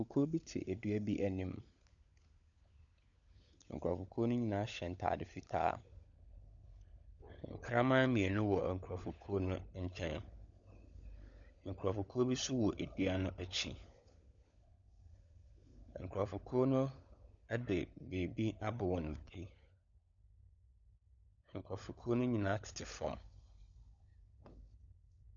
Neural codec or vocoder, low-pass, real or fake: codec, 16 kHz, 16 kbps, FunCodec, trained on LibriTTS, 50 frames a second; 7.2 kHz; fake